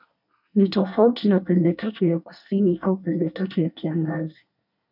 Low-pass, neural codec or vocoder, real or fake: 5.4 kHz; codec, 24 kHz, 1 kbps, SNAC; fake